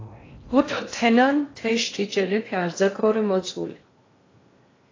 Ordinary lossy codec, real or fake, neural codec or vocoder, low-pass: AAC, 32 kbps; fake; codec, 16 kHz in and 24 kHz out, 0.6 kbps, FocalCodec, streaming, 4096 codes; 7.2 kHz